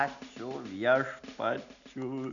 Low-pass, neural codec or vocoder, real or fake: 7.2 kHz; none; real